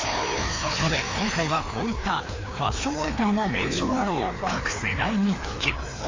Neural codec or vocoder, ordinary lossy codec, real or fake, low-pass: codec, 16 kHz, 2 kbps, FreqCodec, larger model; AAC, 32 kbps; fake; 7.2 kHz